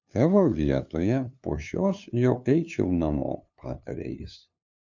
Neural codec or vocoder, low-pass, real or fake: codec, 16 kHz, 2 kbps, FunCodec, trained on LibriTTS, 25 frames a second; 7.2 kHz; fake